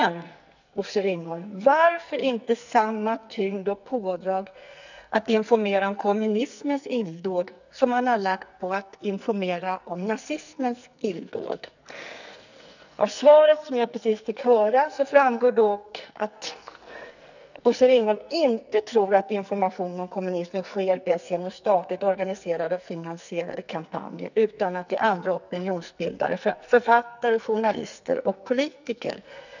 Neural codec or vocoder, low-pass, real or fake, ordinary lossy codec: codec, 44.1 kHz, 2.6 kbps, SNAC; 7.2 kHz; fake; none